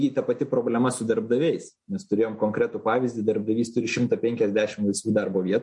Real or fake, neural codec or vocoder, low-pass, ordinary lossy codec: real; none; 10.8 kHz; MP3, 48 kbps